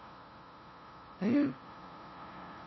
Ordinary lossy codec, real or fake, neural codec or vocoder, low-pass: MP3, 24 kbps; fake; codec, 16 kHz, 0.5 kbps, FunCodec, trained on LibriTTS, 25 frames a second; 7.2 kHz